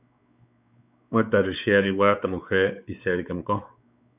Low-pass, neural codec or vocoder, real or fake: 3.6 kHz; codec, 16 kHz, 4 kbps, X-Codec, WavLM features, trained on Multilingual LibriSpeech; fake